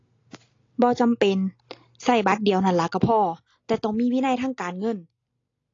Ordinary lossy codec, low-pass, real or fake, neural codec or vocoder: AAC, 32 kbps; 7.2 kHz; real; none